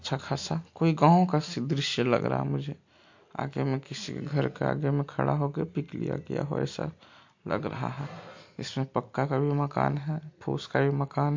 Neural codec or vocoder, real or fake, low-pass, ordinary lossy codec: none; real; 7.2 kHz; MP3, 48 kbps